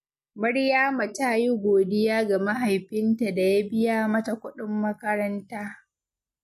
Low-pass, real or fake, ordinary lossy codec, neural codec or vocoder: 14.4 kHz; real; MP3, 64 kbps; none